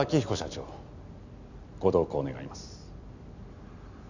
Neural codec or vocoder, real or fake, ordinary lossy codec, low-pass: none; real; none; 7.2 kHz